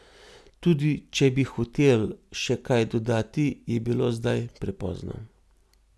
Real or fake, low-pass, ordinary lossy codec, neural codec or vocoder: real; none; none; none